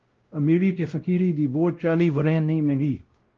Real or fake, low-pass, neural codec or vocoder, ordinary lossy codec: fake; 7.2 kHz; codec, 16 kHz, 0.5 kbps, X-Codec, WavLM features, trained on Multilingual LibriSpeech; Opus, 16 kbps